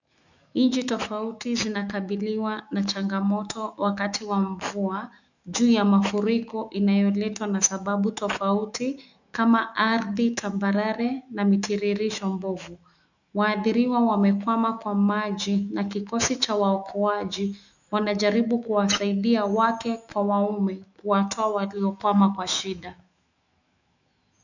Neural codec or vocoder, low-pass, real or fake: autoencoder, 48 kHz, 128 numbers a frame, DAC-VAE, trained on Japanese speech; 7.2 kHz; fake